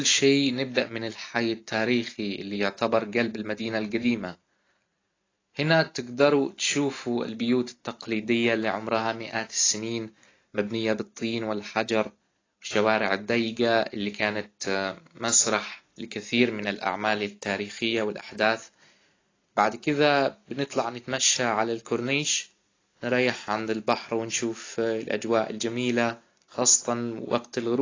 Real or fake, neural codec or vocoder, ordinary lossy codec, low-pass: real; none; AAC, 32 kbps; 7.2 kHz